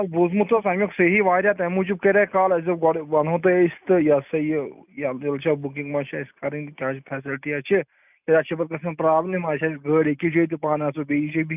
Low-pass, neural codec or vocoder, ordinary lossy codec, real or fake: 3.6 kHz; none; none; real